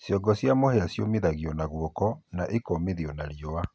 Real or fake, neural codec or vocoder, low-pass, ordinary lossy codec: real; none; none; none